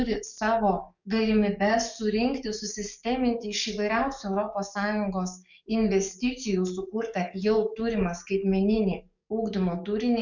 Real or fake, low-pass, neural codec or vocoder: fake; 7.2 kHz; codec, 44.1 kHz, 7.8 kbps, DAC